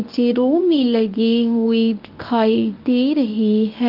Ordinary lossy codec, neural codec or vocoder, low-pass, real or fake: Opus, 24 kbps; codec, 24 kHz, 0.9 kbps, WavTokenizer, medium speech release version 1; 5.4 kHz; fake